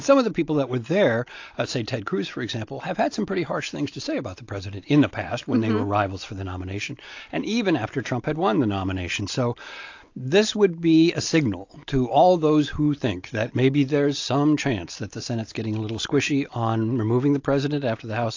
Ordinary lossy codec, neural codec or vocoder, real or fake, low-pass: AAC, 48 kbps; none; real; 7.2 kHz